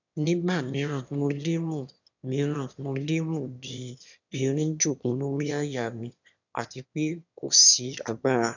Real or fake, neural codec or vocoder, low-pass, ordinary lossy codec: fake; autoencoder, 22.05 kHz, a latent of 192 numbers a frame, VITS, trained on one speaker; 7.2 kHz; none